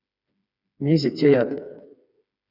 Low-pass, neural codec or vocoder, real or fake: 5.4 kHz; codec, 16 kHz, 4 kbps, FreqCodec, smaller model; fake